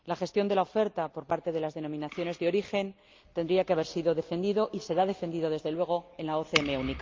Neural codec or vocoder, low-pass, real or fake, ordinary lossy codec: none; 7.2 kHz; real; Opus, 24 kbps